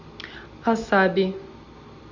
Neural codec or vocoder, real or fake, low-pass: none; real; 7.2 kHz